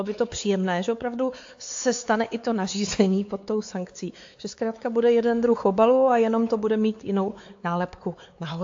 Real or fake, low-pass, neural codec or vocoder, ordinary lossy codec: fake; 7.2 kHz; codec, 16 kHz, 4 kbps, X-Codec, WavLM features, trained on Multilingual LibriSpeech; AAC, 48 kbps